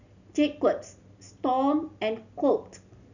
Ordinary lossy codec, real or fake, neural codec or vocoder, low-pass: none; real; none; 7.2 kHz